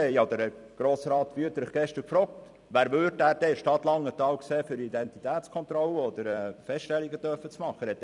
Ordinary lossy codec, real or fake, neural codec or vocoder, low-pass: none; fake; vocoder, 44.1 kHz, 128 mel bands every 256 samples, BigVGAN v2; 10.8 kHz